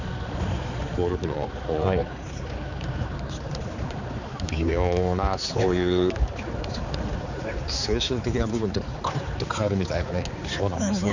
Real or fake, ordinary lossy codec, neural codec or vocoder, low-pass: fake; none; codec, 16 kHz, 4 kbps, X-Codec, HuBERT features, trained on balanced general audio; 7.2 kHz